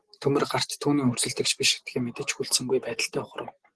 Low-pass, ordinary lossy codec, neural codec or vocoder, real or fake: 10.8 kHz; Opus, 16 kbps; none; real